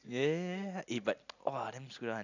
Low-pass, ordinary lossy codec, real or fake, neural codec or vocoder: 7.2 kHz; none; real; none